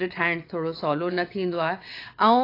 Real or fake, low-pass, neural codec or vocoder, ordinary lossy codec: fake; 5.4 kHz; vocoder, 22.05 kHz, 80 mel bands, WaveNeXt; AAC, 32 kbps